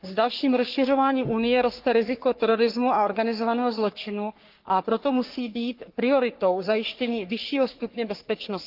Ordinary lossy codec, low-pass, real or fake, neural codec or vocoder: Opus, 32 kbps; 5.4 kHz; fake; codec, 44.1 kHz, 3.4 kbps, Pupu-Codec